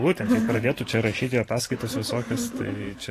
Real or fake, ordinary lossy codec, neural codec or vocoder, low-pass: fake; AAC, 48 kbps; vocoder, 44.1 kHz, 128 mel bands every 512 samples, BigVGAN v2; 14.4 kHz